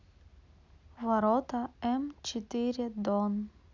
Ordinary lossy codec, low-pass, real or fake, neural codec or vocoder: none; 7.2 kHz; real; none